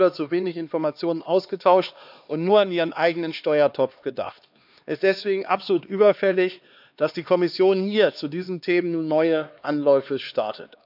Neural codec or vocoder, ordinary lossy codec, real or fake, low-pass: codec, 16 kHz, 2 kbps, X-Codec, HuBERT features, trained on LibriSpeech; none; fake; 5.4 kHz